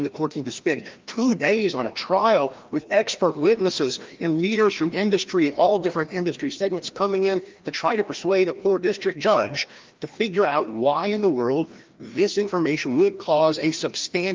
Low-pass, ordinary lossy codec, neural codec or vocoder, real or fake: 7.2 kHz; Opus, 32 kbps; codec, 16 kHz, 1 kbps, FreqCodec, larger model; fake